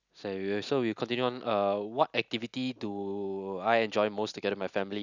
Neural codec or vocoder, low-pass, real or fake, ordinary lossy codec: none; 7.2 kHz; real; none